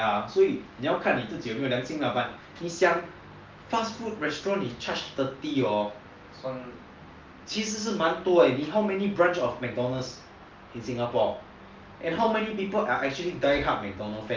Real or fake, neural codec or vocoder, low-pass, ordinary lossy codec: real; none; 7.2 kHz; Opus, 32 kbps